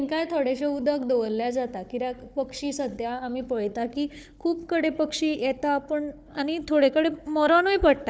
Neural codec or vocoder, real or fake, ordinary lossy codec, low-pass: codec, 16 kHz, 4 kbps, FunCodec, trained on Chinese and English, 50 frames a second; fake; none; none